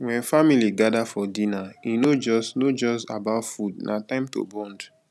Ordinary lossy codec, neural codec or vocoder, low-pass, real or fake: none; none; none; real